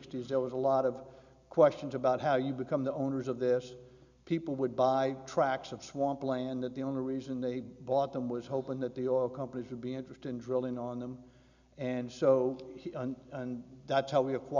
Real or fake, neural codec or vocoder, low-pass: real; none; 7.2 kHz